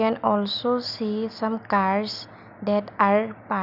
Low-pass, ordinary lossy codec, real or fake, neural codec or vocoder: 5.4 kHz; none; real; none